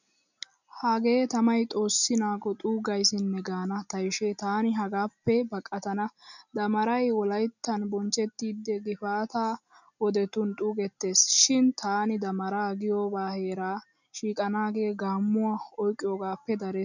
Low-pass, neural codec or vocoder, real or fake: 7.2 kHz; none; real